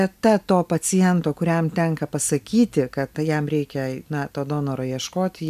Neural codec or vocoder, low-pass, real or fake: none; 14.4 kHz; real